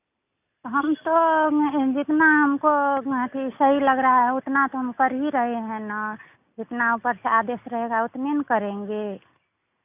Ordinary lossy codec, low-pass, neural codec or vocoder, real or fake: none; 3.6 kHz; none; real